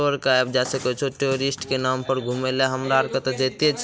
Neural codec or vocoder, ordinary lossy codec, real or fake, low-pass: none; none; real; none